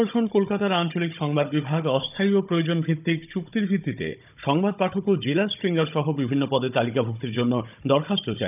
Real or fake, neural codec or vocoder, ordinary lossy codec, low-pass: fake; codec, 16 kHz, 16 kbps, FunCodec, trained on Chinese and English, 50 frames a second; none; 3.6 kHz